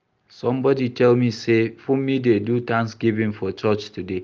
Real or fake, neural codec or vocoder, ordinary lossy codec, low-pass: real; none; Opus, 24 kbps; 7.2 kHz